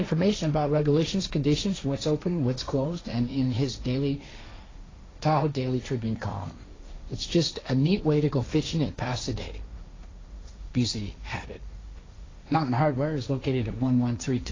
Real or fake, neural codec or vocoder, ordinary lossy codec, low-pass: fake; codec, 16 kHz, 1.1 kbps, Voila-Tokenizer; AAC, 32 kbps; 7.2 kHz